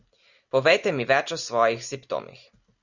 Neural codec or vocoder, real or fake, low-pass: none; real; 7.2 kHz